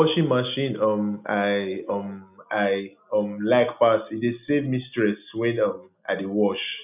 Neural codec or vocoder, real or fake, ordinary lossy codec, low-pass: none; real; none; 3.6 kHz